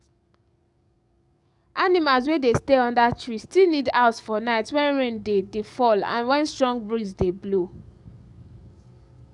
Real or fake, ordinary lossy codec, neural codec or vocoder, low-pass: fake; none; codec, 44.1 kHz, 7.8 kbps, DAC; 10.8 kHz